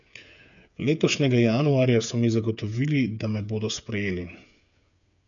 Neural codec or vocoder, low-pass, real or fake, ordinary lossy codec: codec, 16 kHz, 8 kbps, FreqCodec, smaller model; 7.2 kHz; fake; none